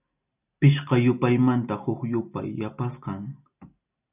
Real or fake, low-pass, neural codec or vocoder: real; 3.6 kHz; none